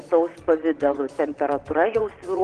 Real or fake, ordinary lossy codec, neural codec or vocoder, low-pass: fake; Opus, 16 kbps; vocoder, 22.05 kHz, 80 mel bands, Vocos; 9.9 kHz